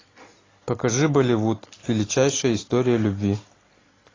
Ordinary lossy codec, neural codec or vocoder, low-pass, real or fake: AAC, 32 kbps; none; 7.2 kHz; real